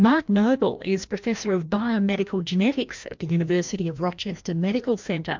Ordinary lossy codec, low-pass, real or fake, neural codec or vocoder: MP3, 64 kbps; 7.2 kHz; fake; codec, 16 kHz, 1 kbps, FreqCodec, larger model